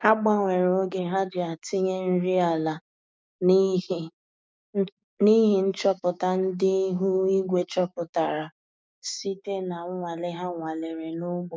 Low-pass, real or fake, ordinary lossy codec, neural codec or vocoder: none; fake; none; codec, 16 kHz, 6 kbps, DAC